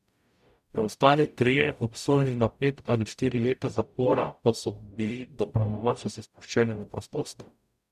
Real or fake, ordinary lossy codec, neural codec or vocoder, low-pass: fake; none; codec, 44.1 kHz, 0.9 kbps, DAC; 14.4 kHz